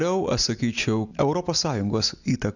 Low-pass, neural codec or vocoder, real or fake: 7.2 kHz; none; real